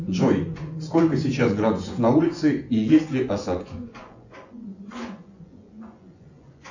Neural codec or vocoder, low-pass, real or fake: vocoder, 24 kHz, 100 mel bands, Vocos; 7.2 kHz; fake